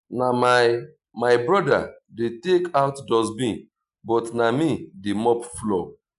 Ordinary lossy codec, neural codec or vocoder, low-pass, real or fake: none; none; 10.8 kHz; real